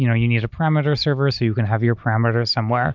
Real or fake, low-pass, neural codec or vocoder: real; 7.2 kHz; none